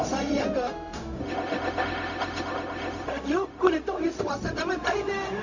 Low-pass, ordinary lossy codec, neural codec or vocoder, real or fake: 7.2 kHz; none; codec, 16 kHz, 0.4 kbps, LongCat-Audio-Codec; fake